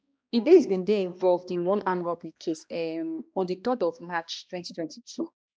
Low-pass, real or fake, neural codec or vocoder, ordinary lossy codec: none; fake; codec, 16 kHz, 1 kbps, X-Codec, HuBERT features, trained on balanced general audio; none